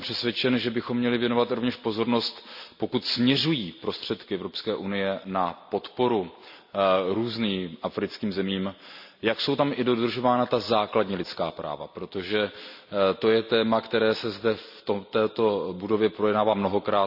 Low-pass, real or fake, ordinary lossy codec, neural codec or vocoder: 5.4 kHz; real; none; none